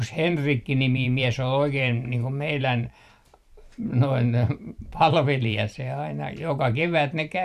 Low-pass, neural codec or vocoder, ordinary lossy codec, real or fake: 14.4 kHz; vocoder, 44.1 kHz, 128 mel bands every 512 samples, BigVGAN v2; none; fake